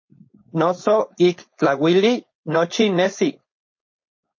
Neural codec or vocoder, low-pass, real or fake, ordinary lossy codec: codec, 16 kHz, 4.8 kbps, FACodec; 7.2 kHz; fake; MP3, 32 kbps